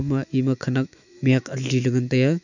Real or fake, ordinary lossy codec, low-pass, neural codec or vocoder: fake; none; 7.2 kHz; autoencoder, 48 kHz, 128 numbers a frame, DAC-VAE, trained on Japanese speech